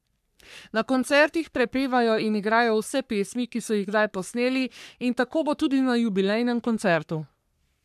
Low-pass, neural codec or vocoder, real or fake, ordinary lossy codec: 14.4 kHz; codec, 44.1 kHz, 3.4 kbps, Pupu-Codec; fake; none